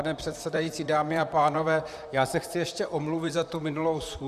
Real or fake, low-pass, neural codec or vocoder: fake; 14.4 kHz; vocoder, 44.1 kHz, 128 mel bands, Pupu-Vocoder